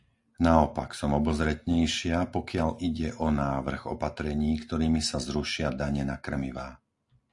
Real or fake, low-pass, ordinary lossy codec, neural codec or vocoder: real; 10.8 kHz; AAC, 64 kbps; none